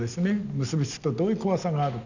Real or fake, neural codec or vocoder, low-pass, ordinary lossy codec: real; none; 7.2 kHz; none